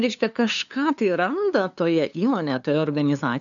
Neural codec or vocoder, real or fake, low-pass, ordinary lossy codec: codec, 16 kHz, 2 kbps, FunCodec, trained on LibriTTS, 25 frames a second; fake; 7.2 kHz; MP3, 96 kbps